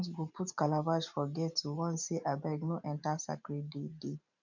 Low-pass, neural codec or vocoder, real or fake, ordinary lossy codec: 7.2 kHz; vocoder, 44.1 kHz, 128 mel bands every 512 samples, BigVGAN v2; fake; none